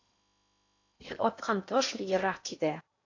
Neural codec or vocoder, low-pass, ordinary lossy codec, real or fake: codec, 16 kHz in and 24 kHz out, 0.8 kbps, FocalCodec, streaming, 65536 codes; 7.2 kHz; AAC, 48 kbps; fake